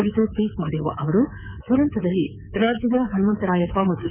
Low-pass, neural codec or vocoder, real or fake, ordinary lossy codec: 3.6 kHz; codec, 24 kHz, 3.1 kbps, DualCodec; fake; none